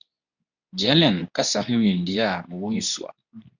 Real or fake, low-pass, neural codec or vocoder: fake; 7.2 kHz; codec, 24 kHz, 0.9 kbps, WavTokenizer, medium speech release version 2